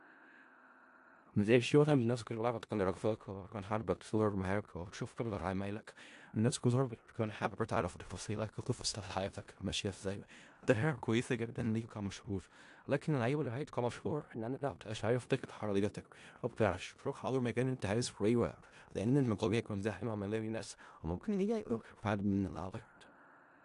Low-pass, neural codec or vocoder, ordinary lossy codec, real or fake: 10.8 kHz; codec, 16 kHz in and 24 kHz out, 0.4 kbps, LongCat-Audio-Codec, four codebook decoder; AAC, 64 kbps; fake